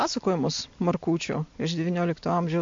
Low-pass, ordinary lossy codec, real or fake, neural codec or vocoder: 7.2 kHz; MP3, 48 kbps; real; none